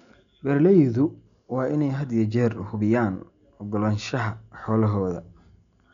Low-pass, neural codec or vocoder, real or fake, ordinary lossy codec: 7.2 kHz; none; real; none